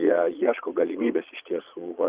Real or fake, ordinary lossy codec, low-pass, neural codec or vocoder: fake; Opus, 32 kbps; 3.6 kHz; vocoder, 22.05 kHz, 80 mel bands, Vocos